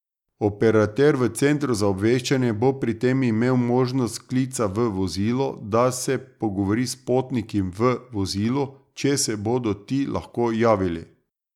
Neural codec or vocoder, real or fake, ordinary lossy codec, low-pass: none; real; none; 19.8 kHz